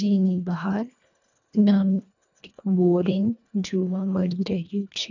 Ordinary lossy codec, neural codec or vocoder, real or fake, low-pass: none; codec, 24 kHz, 1.5 kbps, HILCodec; fake; 7.2 kHz